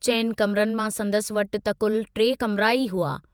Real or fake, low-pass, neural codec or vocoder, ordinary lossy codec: fake; none; vocoder, 48 kHz, 128 mel bands, Vocos; none